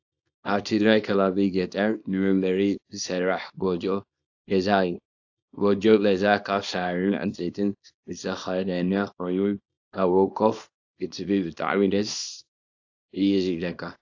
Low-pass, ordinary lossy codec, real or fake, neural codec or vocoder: 7.2 kHz; AAC, 48 kbps; fake; codec, 24 kHz, 0.9 kbps, WavTokenizer, small release